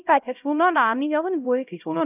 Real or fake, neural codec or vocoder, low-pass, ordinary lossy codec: fake; codec, 16 kHz, 0.5 kbps, X-Codec, HuBERT features, trained on LibriSpeech; 3.6 kHz; none